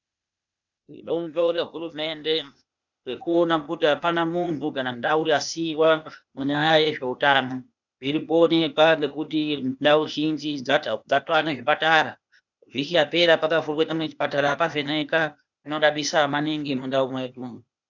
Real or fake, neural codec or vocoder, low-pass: fake; codec, 16 kHz, 0.8 kbps, ZipCodec; 7.2 kHz